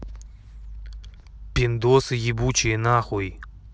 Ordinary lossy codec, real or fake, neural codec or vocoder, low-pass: none; real; none; none